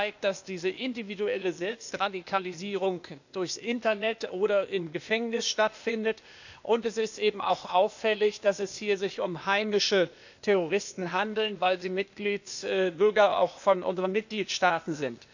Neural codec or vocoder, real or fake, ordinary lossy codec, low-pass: codec, 16 kHz, 0.8 kbps, ZipCodec; fake; none; 7.2 kHz